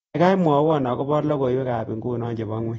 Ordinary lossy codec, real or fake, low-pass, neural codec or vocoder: AAC, 24 kbps; fake; 19.8 kHz; vocoder, 48 kHz, 128 mel bands, Vocos